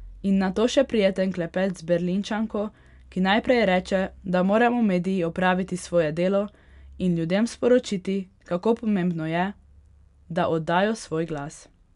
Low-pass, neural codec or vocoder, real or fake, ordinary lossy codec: 10.8 kHz; none; real; none